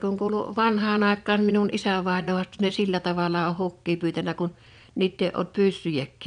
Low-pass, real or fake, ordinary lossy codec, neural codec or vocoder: 9.9 kHz; fake; none; vocoder, 22.05 kHz, 80 mel bands, Vocos